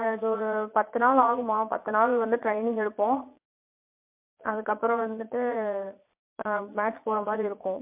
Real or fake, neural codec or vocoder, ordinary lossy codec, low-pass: fake; vocoder, 22.05 kHz, 80 mel bands, Vocos; MP3, 32 kbps; 3.6 kHz